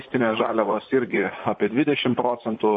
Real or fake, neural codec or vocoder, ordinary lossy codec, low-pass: fake; vocoder, 44.1 kHz, 128 mel bands, Pupu-Vocoder; MP3, 32 kbps; 10.8 kHz